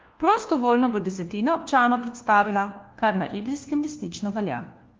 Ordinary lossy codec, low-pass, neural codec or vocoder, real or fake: Opus, 32 kbps; 7.2 kHz; codec, 16 kHz, 1 kbps, FunCodec, trained on LibriTTS, 50 frames a second; fake